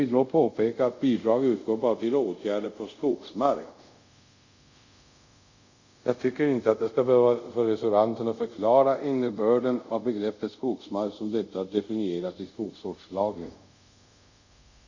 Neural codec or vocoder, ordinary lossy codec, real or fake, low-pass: codec, 24 kHz, 0.5 kbps, DualCodec; Opus, 64 kbps; fake; 7.2 kHz